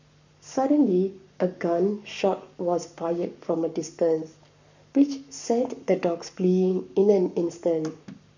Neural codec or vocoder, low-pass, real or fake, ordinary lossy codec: codec, 44.1 kHz, 7.8 kbps, Pupu-Codec; 7.2 kHz; fake; none